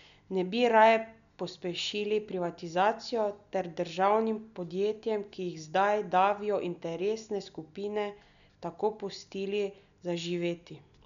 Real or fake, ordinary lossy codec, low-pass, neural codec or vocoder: real; none; 7.2 kHz; none